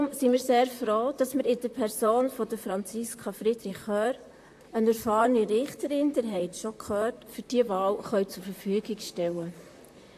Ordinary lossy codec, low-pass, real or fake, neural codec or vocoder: AAC, 64 kbps; 14.4 kHz; fake; vocoder, 44.1 kHz, 128 mel bands, Pupu-Vocoder